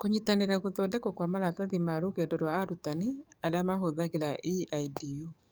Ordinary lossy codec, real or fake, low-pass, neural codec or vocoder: none; fake; none; codec, 44.1 kHz, 7.8 kbps, DAC